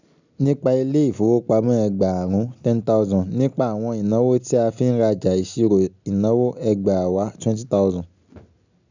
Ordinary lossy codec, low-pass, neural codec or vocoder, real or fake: none; 7.2 kHz; none; real